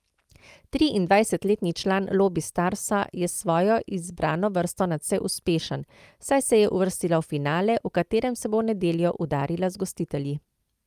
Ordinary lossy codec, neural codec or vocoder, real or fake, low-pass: Opus, 32 kbps; none; real; 14.4 kHz